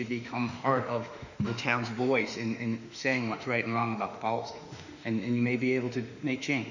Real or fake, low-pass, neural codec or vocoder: fake; 7.2 kHz; autoencoder, 48 kHz, 32 numbers a frame, DAC-VAE, trained on Japanese speech